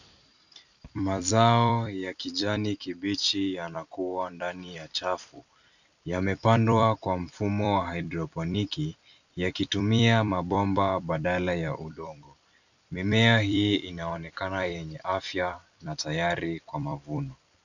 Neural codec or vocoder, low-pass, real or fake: vocoder, 44.1 kHz, 128 mel bands every 256 samples, BigVGAN v2; 7.2 kHz; fake